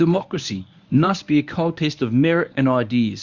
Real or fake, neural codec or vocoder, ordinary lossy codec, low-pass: fake; codec, 24 kHz, 0.9 kbps, WavTokenizer, medium speech release version 1; Opus, 64 kbps; 7.2 kHz